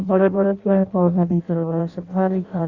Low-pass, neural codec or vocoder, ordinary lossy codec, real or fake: 7.2 kHz; codec, 16 kHz in and 24 kHz out, 0.6 kbps, FireRedTTS-2 codec; Opus, 64 kbps; fake